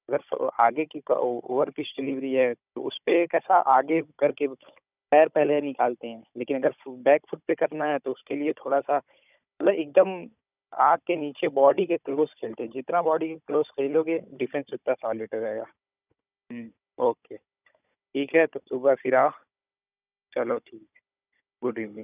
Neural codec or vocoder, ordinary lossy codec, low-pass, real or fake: codec, 16 kHz, 16 kbps, FunCodec, trained on Chinese and English, 50 frames a second; none; 3.6 kHz; fake